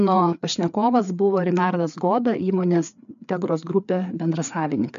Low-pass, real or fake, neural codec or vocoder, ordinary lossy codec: 7.2 kHz; fake; codec, 16 kHz, 4 kbps, FreqCodec, larger model; AAC, 96 kbps